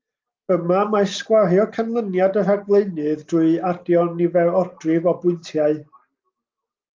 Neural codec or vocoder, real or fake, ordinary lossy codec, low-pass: none; real; Opus, 24 kbps; 7.2 kHz